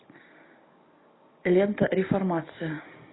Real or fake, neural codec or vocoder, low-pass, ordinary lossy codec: real; none; 7.2 kHz; AAC, 16 kbps